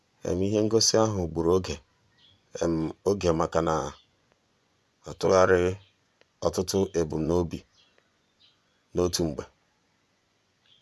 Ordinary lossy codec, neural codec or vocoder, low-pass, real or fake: none; vocoder, 24 kHz, 100 mel bands, Vocos; none; fake